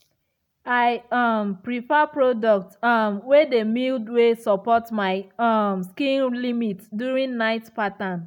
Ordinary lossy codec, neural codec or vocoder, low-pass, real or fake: none; none; 19.8 kHz; real